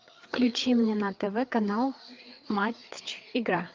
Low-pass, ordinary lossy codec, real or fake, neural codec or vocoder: 7.2 kHz; Opus, 32 kbps; fake; codec, 16 kHz, 4 kbps, FreqCodec, larger model